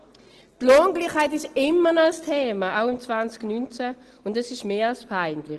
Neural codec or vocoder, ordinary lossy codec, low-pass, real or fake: none; Opus, 16 kbps; 10.8 kHz; real